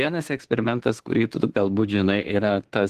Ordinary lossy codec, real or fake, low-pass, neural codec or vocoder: Opus, 16 kbps; fake; 14.4 kHz; autoencoder, 48 kHz, 32 numbers a frame, DAC-VAE, trained on Japanese speech